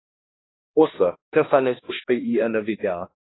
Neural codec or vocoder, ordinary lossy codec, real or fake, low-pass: codec, 16 kHz, 1 kbps, X-Codec, HuBERT features, trained on balanced general audio; AAC, 16 kbps; fake; 7.2 kHz